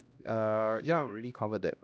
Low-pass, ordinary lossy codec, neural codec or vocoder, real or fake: none; none; codec, 16 kHz, 1 kbps, X-Codec, HuBERT features, trained on LibriSpeech; fake